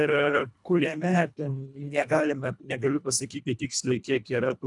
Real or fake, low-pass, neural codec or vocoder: fake; 10.8 kHz; codec, 24 kHz, 1.5 kbps, HILCodec